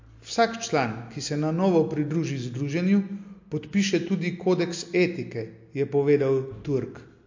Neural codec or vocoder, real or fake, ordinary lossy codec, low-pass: none; real; MP3, 48 kbps; 7.2 kHz